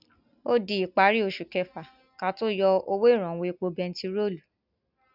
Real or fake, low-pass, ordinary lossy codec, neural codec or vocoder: real; 5.4 kHz; none; none